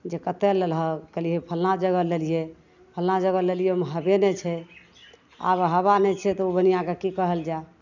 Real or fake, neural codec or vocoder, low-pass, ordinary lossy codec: real; none; 7.2 kHz; none